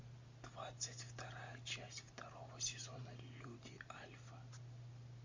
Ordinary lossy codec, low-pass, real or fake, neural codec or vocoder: MP3, 48 kbps; 7.2 kHz; real; none